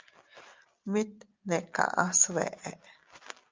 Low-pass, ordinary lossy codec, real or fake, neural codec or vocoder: 7.2 kHz; Opus, 32 kbps; real; none